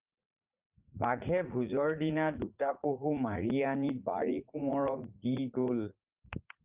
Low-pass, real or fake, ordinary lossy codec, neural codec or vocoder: 3.6 kHz; fake; Opus, 32 kbps; vocoder, 44.1 kHz, 80 mel bands, Vocos